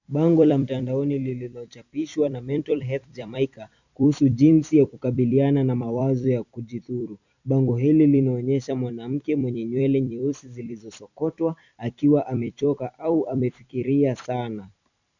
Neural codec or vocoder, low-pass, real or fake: vocoder, 44.1 kHz, 128 mel bands every 256 samples, BigVGAN v2; 7.2 kHz; fake